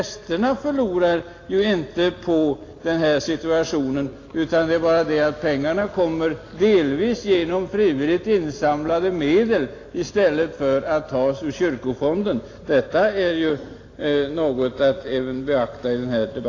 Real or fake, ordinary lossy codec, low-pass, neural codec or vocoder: real; AAC, 32 kbps; 7.2 kHz; none